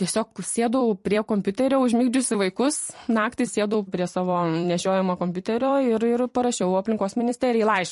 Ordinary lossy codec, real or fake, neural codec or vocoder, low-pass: MP3, 48 kbps; fake; vocoder, 44.1 kHz, 128 mel bands every 256 samples, BigVGAN v2; 14.4 kHz